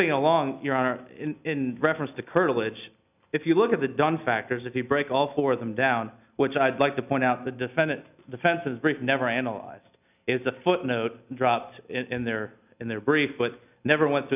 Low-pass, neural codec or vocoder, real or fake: 3.6 kHz; none; real